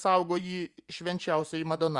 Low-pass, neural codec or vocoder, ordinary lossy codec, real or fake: 10.8 kHz; vocoder, 24 kHz, 100 mel bands, Vocos; Opus, 64 kbps; fake